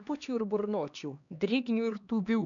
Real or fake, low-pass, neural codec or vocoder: fake; 7.2 kHz; codec, 16 kHz, 2 kbps, X-Codec, HuBERT features, trained on LibriSpeech